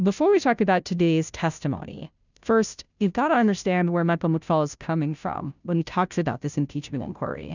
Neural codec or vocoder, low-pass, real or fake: codec, 16 kHz, 0.5 kbps, FunCodec, trained on Chinese and English, 25 frames a second; 7.2 kHz; fake